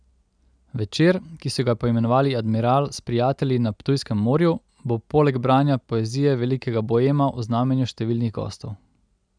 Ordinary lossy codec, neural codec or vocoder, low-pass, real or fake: none; none; 9.9 kHz; real